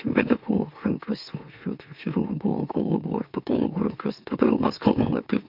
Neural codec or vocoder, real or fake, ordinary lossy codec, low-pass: autoencoder, 44.1 kHz, a latent of 192 numbers a frame, MeloTTS; fake; MP3, 48 kbps; 5.4 kHz